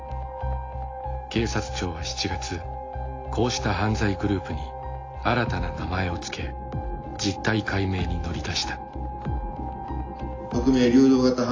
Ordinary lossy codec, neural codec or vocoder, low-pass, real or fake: AAC, 32 kbps; none; 7.2 kHz; real